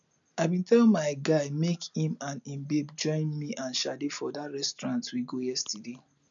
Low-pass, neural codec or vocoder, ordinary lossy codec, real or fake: 7.2 kHz; none; MP3, 96 kbps; real